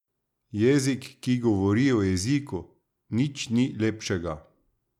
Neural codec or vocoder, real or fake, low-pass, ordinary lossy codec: none; real; 19.8 kHz; none